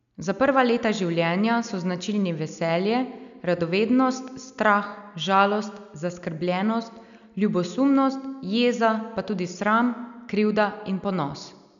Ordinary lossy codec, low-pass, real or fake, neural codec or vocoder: none; 7.2 kHz; real; none